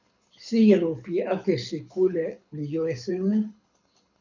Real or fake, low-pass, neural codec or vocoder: fake; 7.2 kHz; codec, 24 kHz, 6 kbps, HILCodec